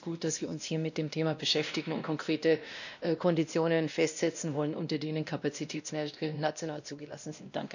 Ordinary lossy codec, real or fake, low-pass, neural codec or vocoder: none; fake; 7.2 kHz; codec, 16 kHz, 1 kbps, X-Codec, WavLM features, trained on Multilingual LibriSpeech